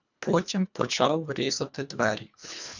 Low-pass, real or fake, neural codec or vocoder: 7.2 kHz; fake; codec, 24 kHz, 1.5 kbps, HILCodec